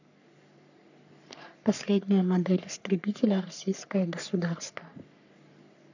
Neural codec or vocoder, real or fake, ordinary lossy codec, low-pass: codec, 44.1 kHz, 3.4 kbps, Pupu-Codec; fake; none; 7.2 kHz